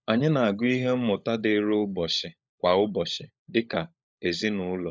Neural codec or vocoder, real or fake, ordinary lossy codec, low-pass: codec, 16 kHz, 16 kbps, FunCodec, trained on LibriTTS, 50 frames a second; fake; none; none